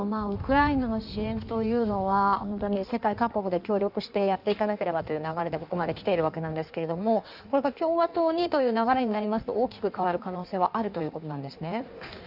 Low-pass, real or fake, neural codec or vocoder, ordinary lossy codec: 5.4 kHz; fake; codec, 16 kHz in and 24 kHz out, 1.1 kbps, FireRedTTS-2 codec; none